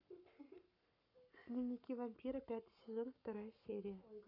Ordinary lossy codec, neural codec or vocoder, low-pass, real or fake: none; codec, 44.1 kHz, 7.8 kbps, Pupu-Codec; 5.4 kHz; fake